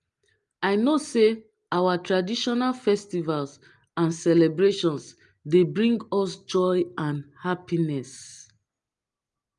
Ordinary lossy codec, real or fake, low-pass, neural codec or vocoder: Opus, 32 kbps; real; 10.8 kHz; none